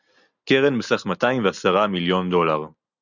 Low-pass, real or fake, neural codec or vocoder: 7.2 kHz; real; none